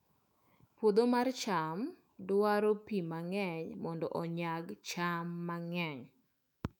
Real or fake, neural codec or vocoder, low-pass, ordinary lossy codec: fake; autoencoder, 48 kHz, 128 numbers a frame, DAC-VAE, trained on Japanese speech; 19.8 kHz; none